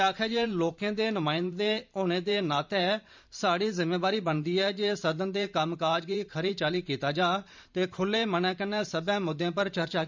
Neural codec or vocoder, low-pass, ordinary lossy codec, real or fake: vocoder, 44.1 kHz, 128 mel bands every 512 samples, BigVGAN v2; 7.2 kHz; MP3, 64 kbps; fake